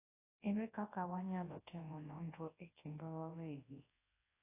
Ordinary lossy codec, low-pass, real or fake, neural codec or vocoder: AAC, 16 kbps; 3.6 kHz; fake; codec, 24 kHz, 0.9 kbps, WavTokenizer, large speech release